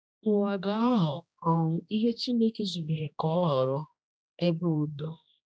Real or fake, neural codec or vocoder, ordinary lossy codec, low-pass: fake; codec, 16 kHz, 1 kbps, X-Codec, HuBERT features, trained on general audio; none; none